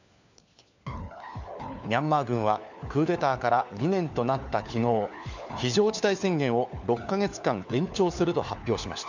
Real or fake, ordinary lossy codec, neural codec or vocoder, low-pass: fake; none; codec, 16 kHz, 4 kbps, FunCodec, trained on LibriTTS, 50 frames a second; 7.2 kHz